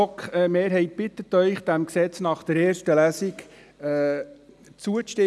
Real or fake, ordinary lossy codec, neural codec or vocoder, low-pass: real; none; none; none